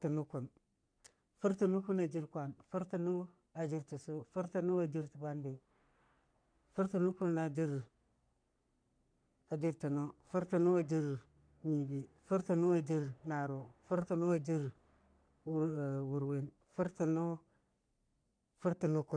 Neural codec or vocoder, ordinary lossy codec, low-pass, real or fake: codec, 44.1 kHz, 3.4 kbps, Pupu-Codec; none; 9.9 kHz; fake